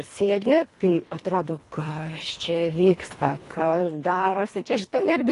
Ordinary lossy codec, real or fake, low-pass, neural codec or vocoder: AAC, 48 kbps; fake; 10.8 kHz; codec, 24 kHz, 1.5 kbps, HILCodec